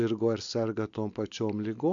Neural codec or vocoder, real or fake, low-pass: none; real; 7.2 kHz